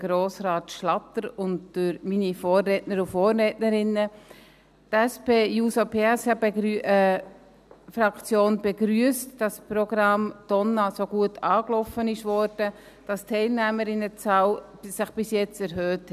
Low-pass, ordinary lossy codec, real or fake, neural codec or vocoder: 14.4 kHz; none; real; none